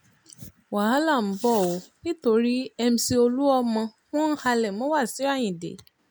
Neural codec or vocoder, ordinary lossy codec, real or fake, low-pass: none; none; real; none